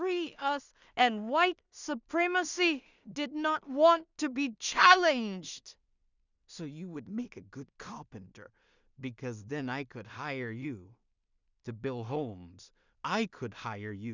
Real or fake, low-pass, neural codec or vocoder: fake; 7.2 kHz; codec, 16 kHz in and 24 kHz out, 0.4 kbps, LongCat-Audio-Codec, two codebook decoder